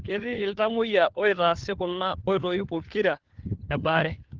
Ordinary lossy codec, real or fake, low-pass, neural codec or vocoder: Opus, 16 kbps; fake; 7.2 kHz; codec, 16 kHz, 4 kbps, FunCodec, trained on LibriTTS, 50 frames a second